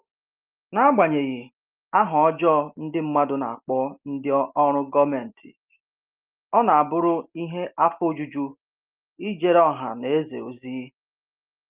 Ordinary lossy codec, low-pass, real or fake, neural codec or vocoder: Opus, 32 kbps; 3.6 kHz; real; none